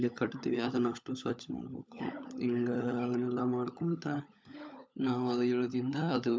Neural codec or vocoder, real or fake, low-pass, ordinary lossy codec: codec, 16 kHz, 16 kbps, FunCodec, trained on LibriTTS, 50 frames a second; fake; none; none